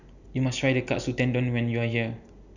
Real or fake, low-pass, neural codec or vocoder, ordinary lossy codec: real; 7.2 kHz; none; none